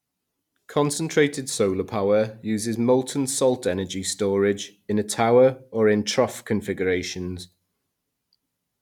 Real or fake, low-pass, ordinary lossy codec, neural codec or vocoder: real; 19.8 kHz; none; none